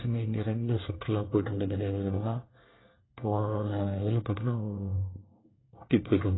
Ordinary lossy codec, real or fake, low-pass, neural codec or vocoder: AAC, 16 kbps; fake; 7.2 kHz; codec, 24 kHz, 1 kbps, SNAC